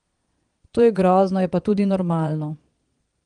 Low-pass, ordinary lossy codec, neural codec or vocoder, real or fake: 9.9 kHz; Opus, 24 kbps; vocoder, 22.05 kHz, 80 mel bands, Vocos; fake